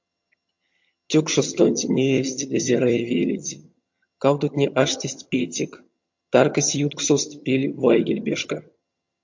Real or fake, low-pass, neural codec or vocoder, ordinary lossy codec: fake; 7.2 kHz; vocoder, 22.05 kHz, 80 mel bands, HiFi-GAN; MP3, 48 kbps